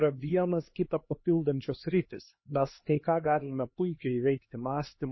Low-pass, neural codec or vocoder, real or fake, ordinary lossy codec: 7.2 kHz; codec, 16 kHz, 2 kbps, X-Codec, HuBERT features, trained on LibriSpeech; fake; MP3, 24 kbps